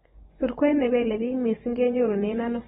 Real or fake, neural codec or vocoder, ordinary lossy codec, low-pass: fake; vocoder, 24 kHz, 100 mel bands, Vocos; AAC, 16 kbps; 10.8 kHz